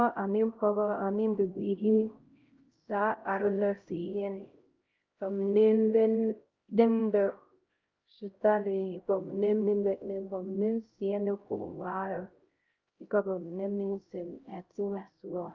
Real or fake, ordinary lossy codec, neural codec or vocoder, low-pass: fake; Opus, 32 kbps; codec, 16 kHz, 0.5 kbps, X-Codec, HuBERT features, trained on LibriSpeech; 7.2 kHz